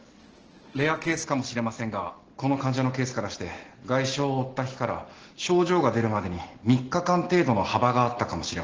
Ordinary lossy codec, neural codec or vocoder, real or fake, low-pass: Opus, 16 kbps; none; real; 7.2 kHz